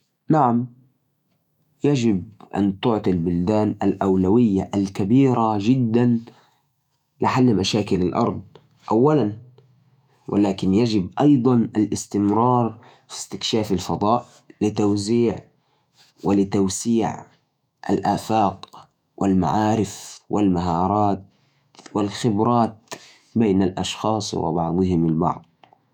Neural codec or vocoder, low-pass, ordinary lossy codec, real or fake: autoencoder, 48 kHz, 128 numbers a frame, DAC-VAE, trained on Japanese speech; 19.8 kHz; none; fake